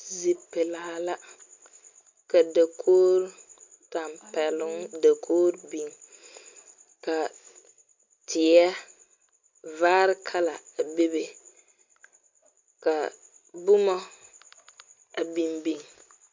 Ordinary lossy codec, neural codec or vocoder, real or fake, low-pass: MP3, 48 kbps; none; real; 7.2 kHz